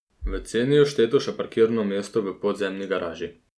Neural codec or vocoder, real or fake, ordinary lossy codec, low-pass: none; real; none; none